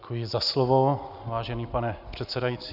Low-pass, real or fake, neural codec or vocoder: 5.4 kHz; real; none